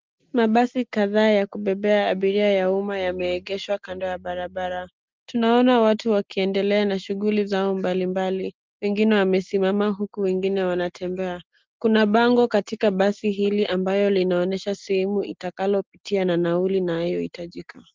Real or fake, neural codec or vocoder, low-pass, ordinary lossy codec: real; none; 7.2 kHz; Opus, 32 kbps